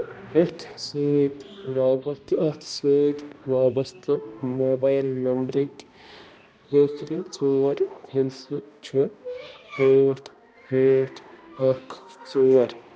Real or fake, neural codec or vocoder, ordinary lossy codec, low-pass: fake; codec, 16 kHz, 1 kbps, X-Codec, HuBERT features, trained on balanced general audio; none; none